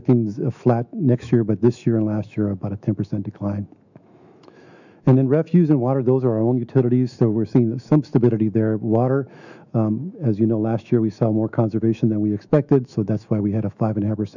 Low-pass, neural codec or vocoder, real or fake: 7.2 kHz; autoencoder, 48 kHz, 128 numbers a frame, DAC-VAE, trained on Japanese speech; fake